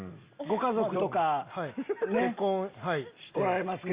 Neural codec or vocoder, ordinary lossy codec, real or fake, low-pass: none; Opus, 64 kbps; real; 3.6 kHz